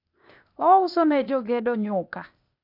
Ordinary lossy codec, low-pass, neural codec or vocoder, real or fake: none; 5.4 kHz; codec, 16 kHz, 0.8 kbps, ZipCodec; fake